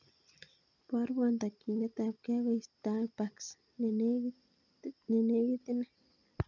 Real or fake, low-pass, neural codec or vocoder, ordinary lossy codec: real; 7.2 kHz; none; none